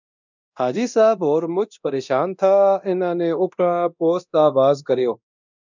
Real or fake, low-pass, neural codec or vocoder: fake; 7.2 kHz; codec, 24 kHz, 0.9 kbps, DualCodec